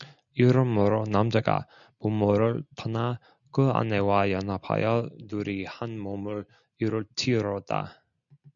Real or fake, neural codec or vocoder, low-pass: real; none; 7.2 kHz